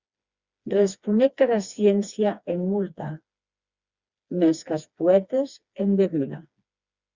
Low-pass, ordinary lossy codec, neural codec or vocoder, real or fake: 7.2 kHz; Opus, 64 kbps; codec, 16 kHz, 2 kbps, FreqCodec, smaller model; fake